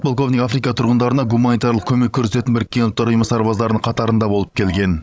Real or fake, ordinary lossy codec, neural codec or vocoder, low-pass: fake; none; codec, 16 kHz, 16 kbps, FreqCodec, larger model; none